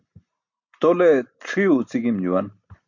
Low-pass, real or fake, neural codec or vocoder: 7.2 kHz; real; none